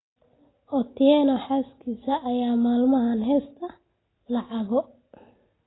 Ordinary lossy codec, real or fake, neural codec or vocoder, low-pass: AAC, 16 kbps; real; none; 7.2 kHz